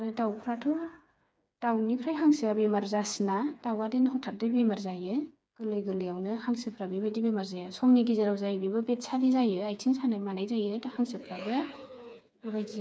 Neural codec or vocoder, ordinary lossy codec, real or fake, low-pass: codec, 16 kHz, 4 kbps, FreqCodec, smaller model; none; fake; none